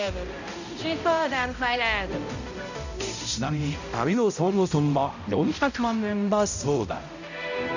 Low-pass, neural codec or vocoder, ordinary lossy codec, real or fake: 7.2 kHz; codec, 16 kHz, 0.5 kbps, X-Codec, HuBERT features, trained on balanced general audio; none; fake